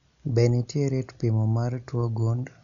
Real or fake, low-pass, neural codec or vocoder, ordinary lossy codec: real; 7.2 kHz; none; none